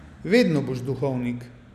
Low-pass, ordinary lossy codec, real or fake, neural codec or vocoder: 14.4 kHz; none; real; none